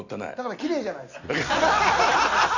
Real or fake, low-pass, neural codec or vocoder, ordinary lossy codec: real; 7.2 kHz; none; none